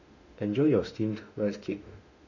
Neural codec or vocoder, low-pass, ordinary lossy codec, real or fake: autoencoder, 48 kHz, 32 numbers a frame, DAC-VAE, trained on Japanese speech; 7.2 kHz; none; fake